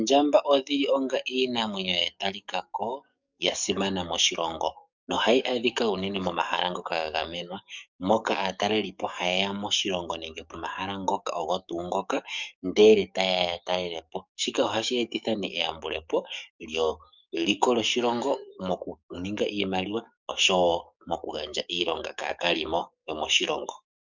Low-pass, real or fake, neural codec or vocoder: 7.2 kHz; fake; codec, 44.1 kHz, 7.8 kbps, DAC